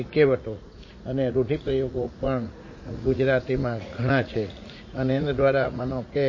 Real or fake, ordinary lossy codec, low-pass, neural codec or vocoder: fake; MP3, 32 kbps; 7.2 kHz; vocoder, 44.1 kHz, 80 mel bands, Vocos